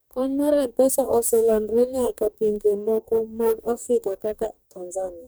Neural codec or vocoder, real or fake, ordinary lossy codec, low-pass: codec, 44.1 kHz, 2.6 kbps, DAC; fake; none; none